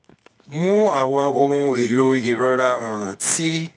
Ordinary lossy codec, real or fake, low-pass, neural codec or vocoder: none; fake; 10.8 kHz; codec, 24 kHz, 0.9 kbps, WavTokenizer, medium music audio release